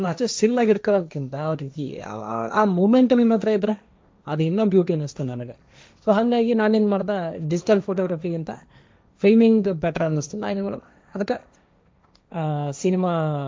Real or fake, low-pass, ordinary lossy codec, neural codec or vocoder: fake; none; none; codec, 16 kHz, 1.1 kbps, Voila-Tokenizer